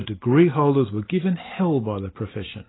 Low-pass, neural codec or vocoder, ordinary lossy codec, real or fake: 7.2 kHz; none; AAC, 16 kbps; real